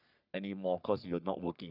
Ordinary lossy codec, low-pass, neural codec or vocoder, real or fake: none; 5.4 kHz; codec, 44.1 kHz, 3.4 kbps, Pupu-Codec; fake